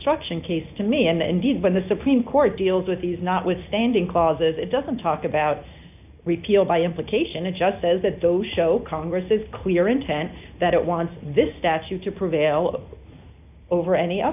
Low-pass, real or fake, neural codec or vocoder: 3.6 kHz; real; none